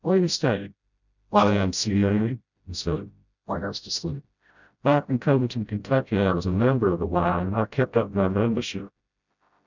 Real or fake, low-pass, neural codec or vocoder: fake; 7.2 kHz; codec, 16 kHz, 0.5 kbps, FreqCodec, smaller model